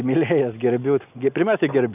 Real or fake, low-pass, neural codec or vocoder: real; 3.6 kHz; none